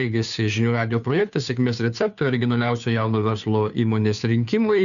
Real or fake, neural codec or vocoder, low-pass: fake; codec, 16 kHz, 2 kbps, FunCodec, trained on Chinese and English, 25 frames a second; 7.2 kHz